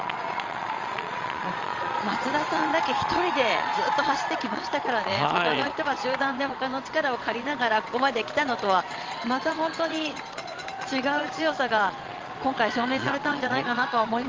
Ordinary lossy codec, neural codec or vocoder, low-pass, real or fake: Opus, 32 kbps; vocoder, 22.05 kHz, 80 mel bands, Vocos; 7.2 kHz; fake